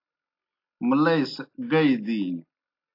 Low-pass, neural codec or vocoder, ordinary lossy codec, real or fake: 5.4 kHz; none; AAC, 32 kbps; real